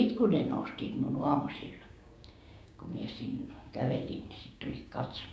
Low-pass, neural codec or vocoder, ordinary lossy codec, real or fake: none; codec, 16 kHz, 6 kbps, DAC; none; fake